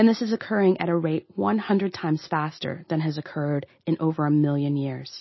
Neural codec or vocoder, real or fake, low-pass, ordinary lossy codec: none; real; 7.2 kHz; MP3, 24 kbps